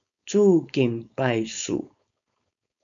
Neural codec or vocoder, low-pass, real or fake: codec, 16 kHz, 4.8 kbps, FACodec; 7.2 kHz; fake